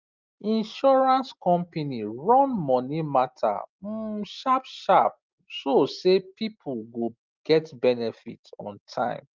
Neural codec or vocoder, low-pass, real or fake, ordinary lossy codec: none; 7.2 kHz; real; Opus, 24 kbps